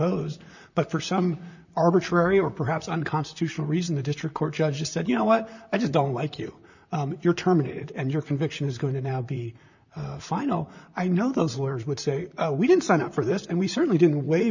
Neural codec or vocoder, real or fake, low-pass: vocoder, 44.1 kHz, 128 mel bands, Pupu-Vocoder; fake; 7.2 kHz